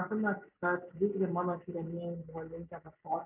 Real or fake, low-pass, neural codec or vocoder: real; 3.6 kHz; none